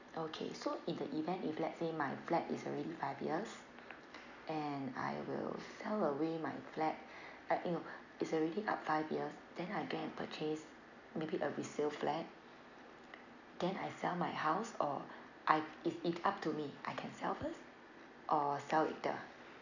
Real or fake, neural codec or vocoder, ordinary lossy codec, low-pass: real; none; none; 7.2 kHz